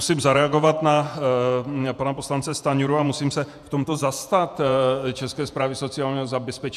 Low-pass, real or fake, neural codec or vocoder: 14.4 kHz; fake; vocoder, 48 kHz, 128 mel bands, Vocos